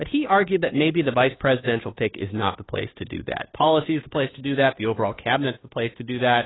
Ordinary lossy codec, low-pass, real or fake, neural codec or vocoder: AAC, 16 kbps; 7.2 kHz; fake; codec, 16 kHz, 2 kbps, FunCodec, trained on Chinese and English, 25 frames a second